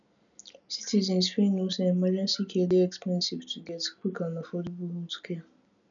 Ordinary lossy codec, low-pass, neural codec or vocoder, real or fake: none; 7.2 kHz; none; real